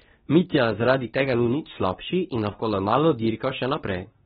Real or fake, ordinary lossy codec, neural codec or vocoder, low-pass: fake; AAC, 16 kbps; codec, 24 kHz, 0.9 kbps, WavTokenizer, medium speech release version 2; 10.8 kHz